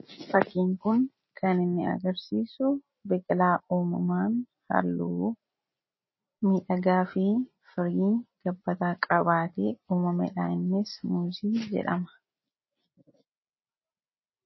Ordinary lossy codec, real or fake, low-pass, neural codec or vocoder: MP3, 24 kbps; real; 7.2 kHz; none